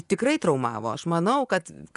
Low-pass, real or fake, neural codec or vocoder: 10.8 kHz; fake; vocoder, 24 kHz, 100 mel bands, Vocos